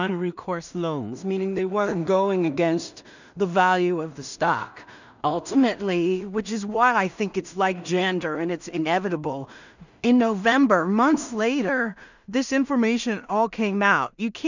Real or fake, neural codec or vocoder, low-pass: fake; codec, 16 kHz in and 24 kHz out, 0.4 kbps, LongCat-Audio-Codec, two codebook decoder; 7.2 kHz